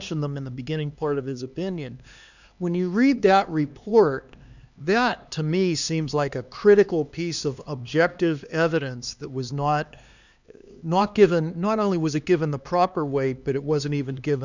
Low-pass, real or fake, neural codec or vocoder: 7.2 kHz; fake; codec, 16 kHz, 2 kbps, X-Codec, HuBERT features, trained on LibriSpeech